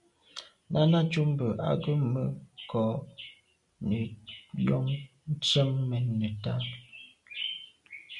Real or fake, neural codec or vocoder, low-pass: real; none; 10.8 kHz